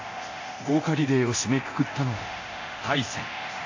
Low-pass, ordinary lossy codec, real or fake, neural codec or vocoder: 7.2 kHz; AAC, 48 kbps; fake; codec, 24 kHz, 0.9 kbps, DualCodec